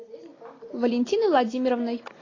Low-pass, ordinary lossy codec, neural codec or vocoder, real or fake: 7.2 kHz; AAC, 32 kbps; none; real